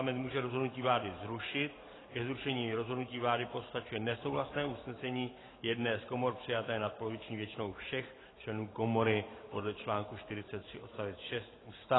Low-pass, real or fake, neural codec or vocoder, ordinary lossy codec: 7.2 kHz; fake; vocoder, 44.1 kHz, 128 mel bands every 512 samples, BigVGAN v2; AAC, 16 kbps